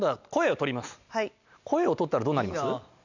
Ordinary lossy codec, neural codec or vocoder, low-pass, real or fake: none; none; 7.2 kHz; real